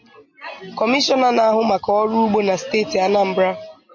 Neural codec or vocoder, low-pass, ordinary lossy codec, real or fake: none; 7.2 kHz; MP3, 32 kbps; real